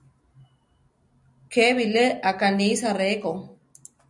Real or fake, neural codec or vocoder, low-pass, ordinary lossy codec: real; none; 10.8 kHz; MP3, 96 kbps